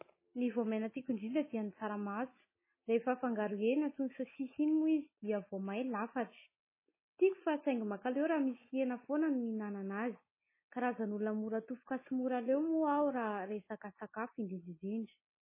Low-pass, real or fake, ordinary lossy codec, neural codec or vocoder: 3.6 kHz; real; MP3, 16 kbps; none